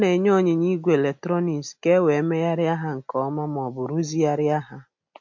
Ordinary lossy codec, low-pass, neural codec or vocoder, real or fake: MP3, 48 kbps; 7.2 kHz; none; real